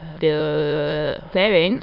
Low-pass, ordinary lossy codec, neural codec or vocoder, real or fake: 5.4 kHz; none; autoencoder, 22.05 kHz, a latent of 192 numbers a frame, VITS, trained on many speakers; fake